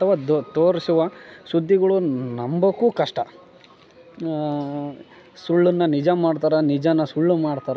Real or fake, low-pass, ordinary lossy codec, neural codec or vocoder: real; none; none; none